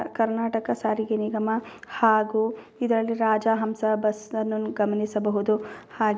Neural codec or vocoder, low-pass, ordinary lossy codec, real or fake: none; none; none; real